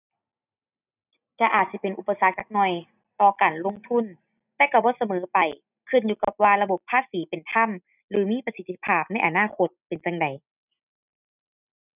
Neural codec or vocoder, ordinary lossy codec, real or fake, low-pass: none; none; real; 3.6 kHz